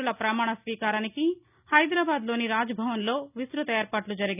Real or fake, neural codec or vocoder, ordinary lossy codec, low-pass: real; none; none; 3.6 kHz